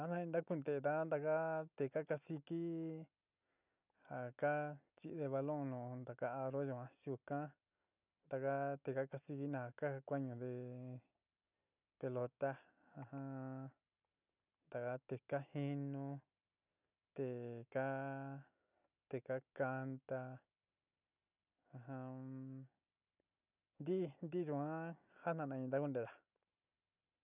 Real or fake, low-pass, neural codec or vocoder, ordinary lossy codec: real; 3.6 kHz; none; none